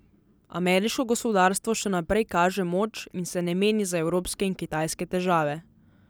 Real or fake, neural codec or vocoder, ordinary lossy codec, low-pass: real; none; none; none